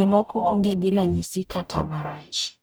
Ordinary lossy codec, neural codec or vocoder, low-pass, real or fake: none; codec, 44.1 kHz, 0.9 kbps, DAC; none; fake